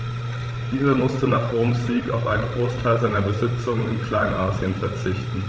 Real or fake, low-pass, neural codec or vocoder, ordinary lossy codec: fake; none; codec, 16 kHz, 16 kbps, FreqCodec, larger model; none